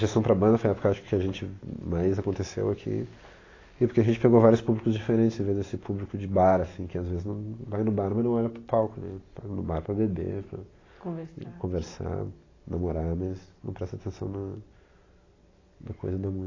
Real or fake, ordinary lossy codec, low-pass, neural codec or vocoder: real; AAC, 32 kbps; 7.2 kHz; none